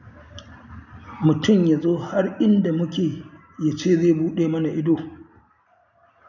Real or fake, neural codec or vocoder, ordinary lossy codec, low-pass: real; none; none; 7.2 kHz